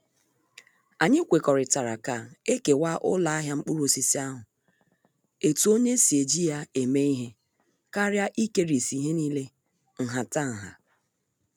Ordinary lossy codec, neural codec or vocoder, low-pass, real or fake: none; none; none; real